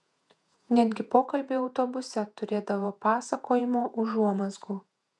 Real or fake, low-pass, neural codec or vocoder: fake; 10.8 kHz; vocoder, 48 kHz, 128 mel bands, Vocos